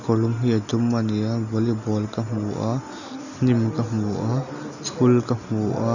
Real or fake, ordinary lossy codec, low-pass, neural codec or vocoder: real; none; 7.2 kHz; none